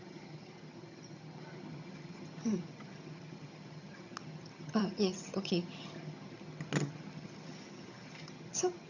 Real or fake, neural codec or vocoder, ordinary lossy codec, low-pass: fake; vocoder, 22.05 kHz, 80 mel bands, HiFi-GAN; none; 7.2 kHz